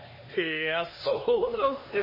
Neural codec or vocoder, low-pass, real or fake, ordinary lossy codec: codec, 16 kHz, 2 kbps, X-Codec, HuBERT features, trained on LibriSpeech; 5.4 kHz; fake; MP3, 24 kbps